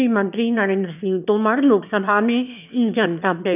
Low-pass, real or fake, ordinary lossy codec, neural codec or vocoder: 3.6 kHz; fake; none; autoencoder, 22.05 kHz, a latent of 192 numbers a frame, VITS, trained on one speaker